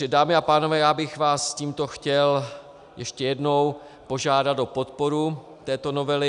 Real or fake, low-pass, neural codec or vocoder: real; 10.8 kHz; none